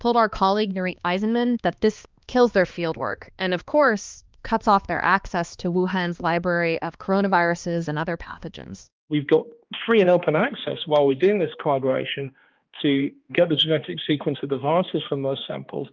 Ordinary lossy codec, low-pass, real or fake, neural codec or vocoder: Opus, 24 kbps; 7.2 kHz; fake; codec, 16 kHz, 2 kbps, X-Codec, HuBERT features, trained on balanced general audio